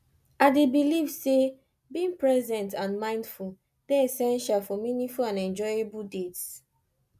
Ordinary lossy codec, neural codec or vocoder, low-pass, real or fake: none; none; 14.4 kHz; real